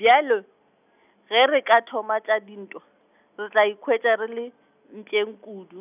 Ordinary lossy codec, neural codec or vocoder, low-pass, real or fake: none; none; 3.6 kHz; real